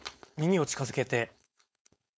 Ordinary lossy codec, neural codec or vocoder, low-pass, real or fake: none; codec, 16 kHz, 4.8 kbps, FACodec; none; fake